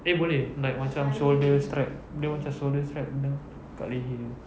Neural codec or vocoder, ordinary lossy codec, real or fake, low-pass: none; none; real; none